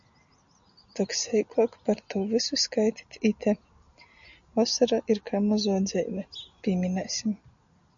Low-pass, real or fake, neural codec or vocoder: 7.2 kHz; real; none